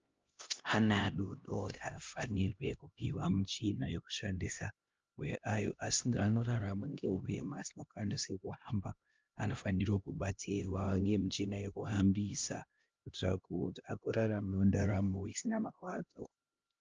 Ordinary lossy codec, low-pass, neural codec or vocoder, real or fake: Opus, 24 kbps; 7.2 kHz; codec, 16 kHz, 1 kbps, X-Codec, HuBERT features, trained on LibriSpeech; fake